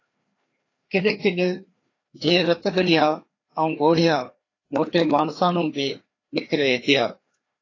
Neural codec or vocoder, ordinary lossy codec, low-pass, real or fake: codec, 16 kHz, 2 kbps, FreqCodec, larger model; AAC, 32 kbps; 7.2 kHz; fake